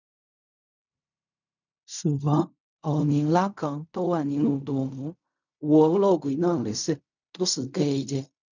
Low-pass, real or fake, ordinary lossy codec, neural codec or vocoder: 7.2 kHz; fake; none; codec, 16 kHz in and 24 kHz out, 0.4 kbps, LongCat-Audio-Codec, fine tuned four codebook decoder